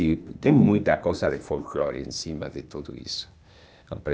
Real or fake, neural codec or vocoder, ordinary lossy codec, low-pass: fake; codec, 16 kHz, 0.8 kbps, ZipCodec; none; none